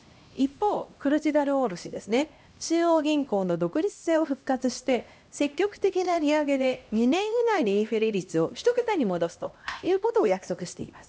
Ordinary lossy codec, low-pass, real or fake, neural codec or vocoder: none; none; fake; codec, 16 kHz, 1 kbps, X-Codec, HuBERT features, trained on LibriSpeech